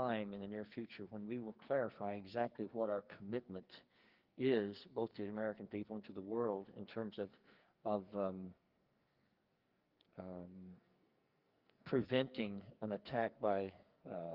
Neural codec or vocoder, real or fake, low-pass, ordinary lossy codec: codec, 44.1 kHz, 2.6 kbps, SNAC; fake; 5.4 kHz; Opus, 32 kbps